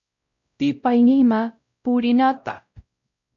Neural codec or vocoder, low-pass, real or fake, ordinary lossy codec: codec, 16 kHz, 0.5 kbps, X-Codec, WavLM features, trained on Multilingual LibriSpeech; 7.2 kHz; fake; MP3, 48 kbps